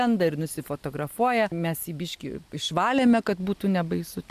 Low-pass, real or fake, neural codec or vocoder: 14.4 kHz; real; none